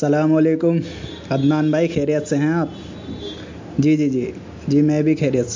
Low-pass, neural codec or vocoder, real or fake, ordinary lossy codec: 7.2 kHz; none; real; MP3, 48 kbps